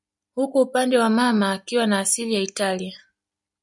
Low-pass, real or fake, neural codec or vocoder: 10.8 kHz; real; none